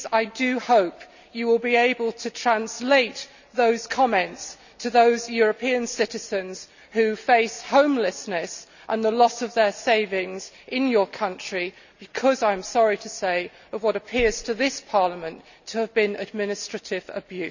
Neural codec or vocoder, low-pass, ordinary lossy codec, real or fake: none; 7.2 kHz; none; real